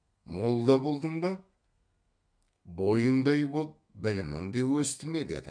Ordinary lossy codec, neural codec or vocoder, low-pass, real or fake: none; codec, 32 kHz, 1.9 kbps, SNAC; 9.9 kHz; fake